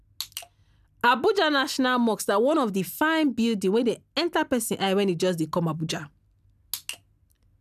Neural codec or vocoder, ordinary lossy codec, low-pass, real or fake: none; none; 14.4 kHz; real